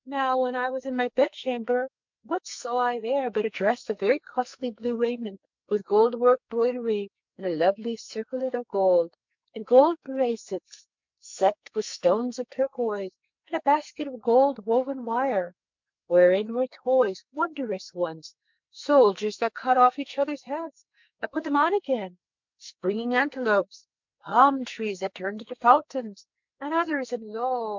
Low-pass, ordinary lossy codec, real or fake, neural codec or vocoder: 7.2 kHz; MP3, 64 kbps; fake; codec, 44.1 kHz, 2.6 kbps, SNAC